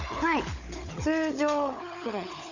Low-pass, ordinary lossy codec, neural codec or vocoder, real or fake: 7.2 kHz; none; codec, 16 kHz, 16 kbps, FunCodec, trained on LibriTTS, 50 frames a second; fake